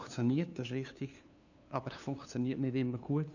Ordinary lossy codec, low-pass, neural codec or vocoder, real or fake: none; 7.2 kHz; codec, 16 kHz, 2 kbps, FunCodec, trained on LibriTTS, 25 frames a second; fake